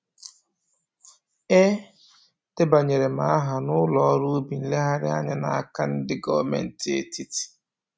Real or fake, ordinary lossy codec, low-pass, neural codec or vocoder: real; none; none; none